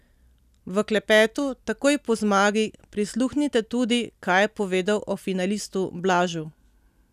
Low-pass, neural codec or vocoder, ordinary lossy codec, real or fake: 14.4 kHz; none; none; real